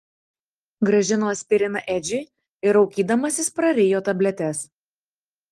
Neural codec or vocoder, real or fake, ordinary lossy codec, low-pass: none; real; Opus, 24 kbps; 14.4 kHz